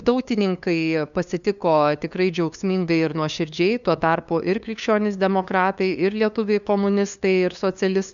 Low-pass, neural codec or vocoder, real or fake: 7.2 kHz; codec, 16 kHz, 2 kbps, FunCodec, trained on LibriTTS, 25 frames a second; fake